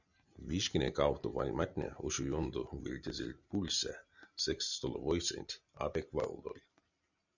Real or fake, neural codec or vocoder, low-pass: real; none; 7.2 kHz